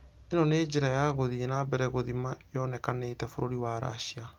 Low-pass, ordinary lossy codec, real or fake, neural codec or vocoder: 19.8 kHz; Opus, 32 kbps; real; none